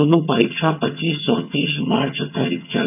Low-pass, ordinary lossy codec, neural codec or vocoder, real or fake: 3.6 kHz; none; vocoder, 22.05 kHz, 80 mel bands, HiFi-GAN; fake